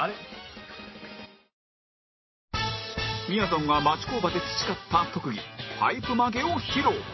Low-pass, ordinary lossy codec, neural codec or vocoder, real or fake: 7.2 kHz; MP3, 24 kbps; none; real